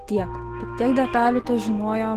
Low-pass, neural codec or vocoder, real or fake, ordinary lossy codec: 14.4 kHz; autoencoder, 48 kHz, 128 numbers a frame, DAC-VAE, trained on Japanese speech; fake; Opus, 16 kbps